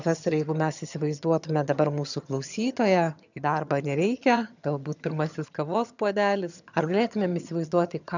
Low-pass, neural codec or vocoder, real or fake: 7.2 kHz; vocoder, 22.05 kHz, 80 mel bands, HiFi-GAN; fake